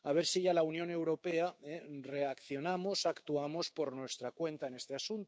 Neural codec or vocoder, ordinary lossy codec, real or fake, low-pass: codec, 16 kHz, 6 kbps, DAC; none; fake; none